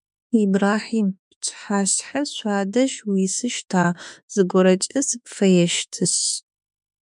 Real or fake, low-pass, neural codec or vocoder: fake; 10.8 kHz; autoencoder, 48 kHz, 32 numbers a frame, DAC-VAE, trained on Japanese speech